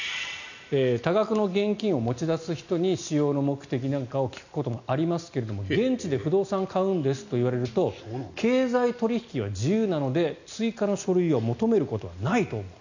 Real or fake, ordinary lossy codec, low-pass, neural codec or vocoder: real; none; 7.2 kHz; none